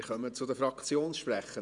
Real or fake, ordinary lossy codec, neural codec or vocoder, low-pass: fake; none; vocoder, 48 kHz, 128 mel bands, Vocos; 10.8 kHz